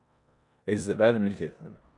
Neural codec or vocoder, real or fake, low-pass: codec, 16 kHz in and 24 kHz out, 0.9 kbps, LongCat-Audio-Codec, four codebook decoder; fake; 10.8 kHz